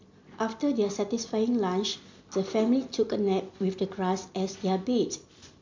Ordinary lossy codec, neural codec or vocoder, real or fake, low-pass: MP3, 64 kbps; none; real; 7.2 kHz